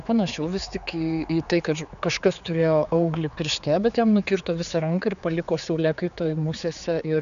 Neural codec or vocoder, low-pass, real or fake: codec, 16 kHz, 4 kbps, X-Codec, HuBERT features, trained on general audio; 7.2 kHz; fake